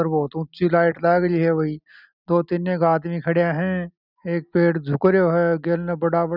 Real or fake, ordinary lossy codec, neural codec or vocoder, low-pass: real; none; none; 5.4 kHz